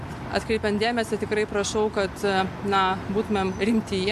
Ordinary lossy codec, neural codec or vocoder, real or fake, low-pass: MP3, 96 kbps; none; real; 14.4 kHz